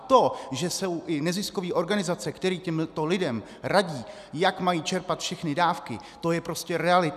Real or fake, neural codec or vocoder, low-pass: real; none; 14.4 kHz